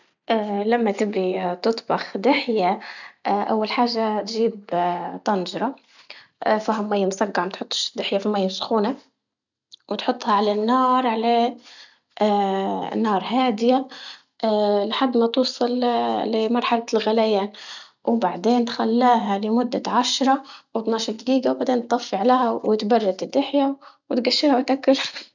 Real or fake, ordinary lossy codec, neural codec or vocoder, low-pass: real; none; none; 7.2 kHz